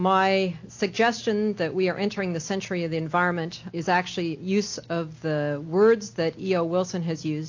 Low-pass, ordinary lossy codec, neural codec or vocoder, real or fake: 7.2 kHz; AAC, 48 kbps; none; real